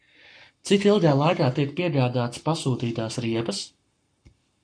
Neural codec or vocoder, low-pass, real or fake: codec, 44.1 kHz, 7.8 kbps, Pupu-Codec; 9.9 kHz; fake